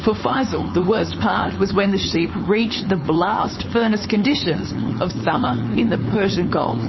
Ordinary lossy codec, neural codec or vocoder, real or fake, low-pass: MP3, 24 kbps; codec, 16 kHz, 4.8 kbps, FACodec; fake; 7.2 kHz